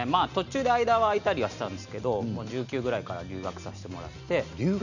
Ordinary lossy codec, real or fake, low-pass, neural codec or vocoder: none; real; 7.2 kHz; none